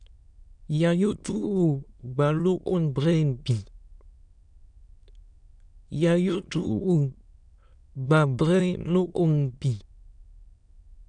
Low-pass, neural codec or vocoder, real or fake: 9.9 kHz; autoencoder, 22.05 kHz, a latent of 192 numbers a frame, VITS, trained on many speakers; fake